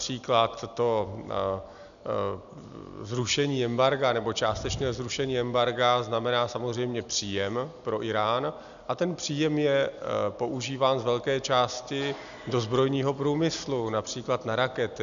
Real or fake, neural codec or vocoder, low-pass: real; none; 7.2 kHz